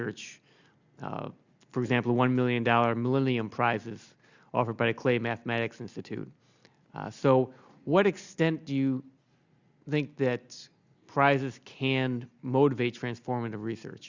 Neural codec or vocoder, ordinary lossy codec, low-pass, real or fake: none; Opus, 64 kbps; 7.2 kHz; real